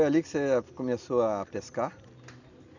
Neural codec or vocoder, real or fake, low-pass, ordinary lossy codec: none; real; 7.2 kHz; none